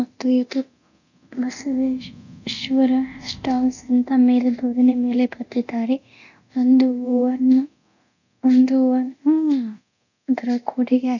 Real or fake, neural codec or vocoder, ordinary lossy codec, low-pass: fake; codec, 24 kHz, 0.9 kbps, DualCodec; none; 7.2 kHz